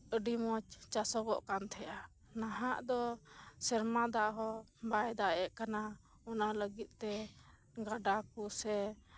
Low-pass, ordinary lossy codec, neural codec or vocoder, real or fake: none; none; none; real